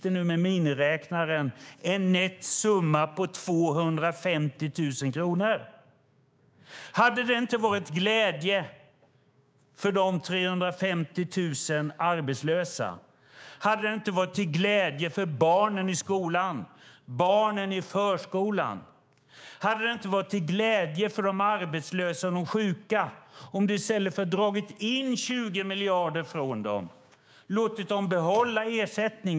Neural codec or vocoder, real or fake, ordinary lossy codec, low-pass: codec, 16 kHz, 6 kbps, DAC; fake; none; none